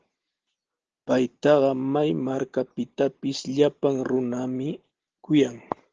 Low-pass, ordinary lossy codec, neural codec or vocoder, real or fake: 7.2 kHz; Opus, 16 kbps; none; real